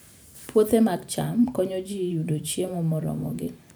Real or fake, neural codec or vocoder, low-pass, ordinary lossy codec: fake; vocoder, 44.1 kHz, 128 mel bands every 512 samples, BigVGAN v2; none; none